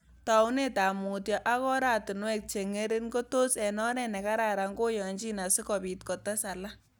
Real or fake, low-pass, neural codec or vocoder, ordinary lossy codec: real; none; none; none